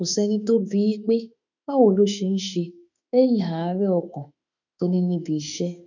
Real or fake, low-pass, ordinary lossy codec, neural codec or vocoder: fake; 7.2 kHz; none; autoencoder, 48 kHz, 32 numbers a frame, DAC-VAE, trained on Japanese speech